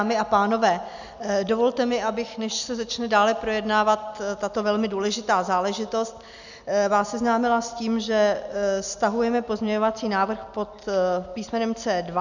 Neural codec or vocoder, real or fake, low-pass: none; real; 7.2 kHz